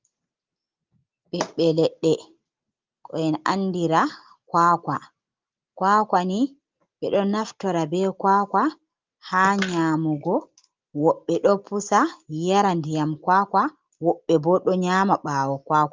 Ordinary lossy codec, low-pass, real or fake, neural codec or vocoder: Opus, 32 kbps; 7.2 kHz; real; none